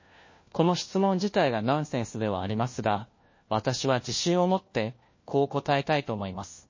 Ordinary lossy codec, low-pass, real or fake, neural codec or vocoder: MP3, 32 kbps; 7.2 kHz; fake; codec, 16 kHz, 1 kbps, FunCodec, trained on LibriTTS, 50 frames a second